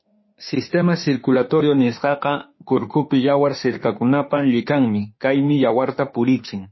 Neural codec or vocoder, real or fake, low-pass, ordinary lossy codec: autoencoder, 48 kHz, 32 numbers a frame, DAC-VAE, trained on Japanese speech; fake; 7.2 kHz; MP3, 24 kbps